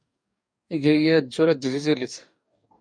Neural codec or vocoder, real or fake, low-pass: codec, 44.1 kHz, 2.6 kbps, DAC; fake; 9.9 kHz